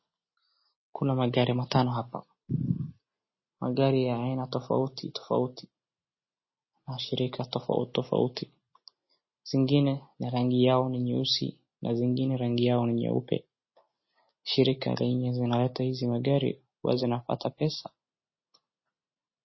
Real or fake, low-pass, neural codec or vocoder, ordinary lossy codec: real; 7.2 kHz; none; MP3, 24 kbps